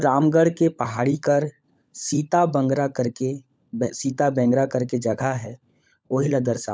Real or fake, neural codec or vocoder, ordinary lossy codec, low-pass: fake; codec, 16 kHz, 16 kbps, FunCodec, trained on LibriTTS, 50 frames a second; none; none